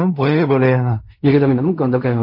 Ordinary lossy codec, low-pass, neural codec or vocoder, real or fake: MP3, 32 kbps; 5.4 kHz; codec, 16 kHz in and 24 kHz out, 0.4 kbps, LongCat-Audio-Codec, fine tuned four codebook decoder; fake